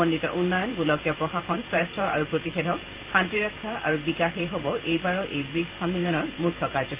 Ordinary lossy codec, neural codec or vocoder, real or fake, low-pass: Opus, 24 kbps; vocoder, 44.1 kHz, 128 mel bands every 512 samples, BigVGAN v2; fake; 3.6 kHz